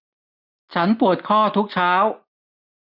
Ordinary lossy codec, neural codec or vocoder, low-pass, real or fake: none; none; 5.4 kHz; real